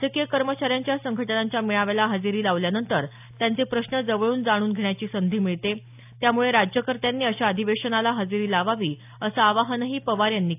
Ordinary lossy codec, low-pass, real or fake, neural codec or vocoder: AAC, 32 kbps; 3.6 kHz; real; none